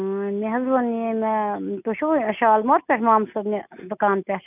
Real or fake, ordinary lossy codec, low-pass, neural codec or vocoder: real; none; 3.6 kHz; none